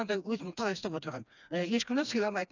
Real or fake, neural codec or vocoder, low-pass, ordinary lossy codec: fake; codec, 16 kHz, 2 kbps, FreqCodec, smaller model; 7.2 kHz; none